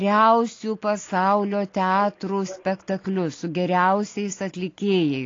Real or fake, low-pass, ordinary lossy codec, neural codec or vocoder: real; 7.2 kHz; AAC, 32 kbps; none